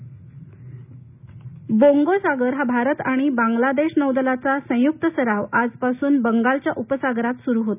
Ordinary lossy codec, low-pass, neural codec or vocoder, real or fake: none; 3.6 kHz; none; real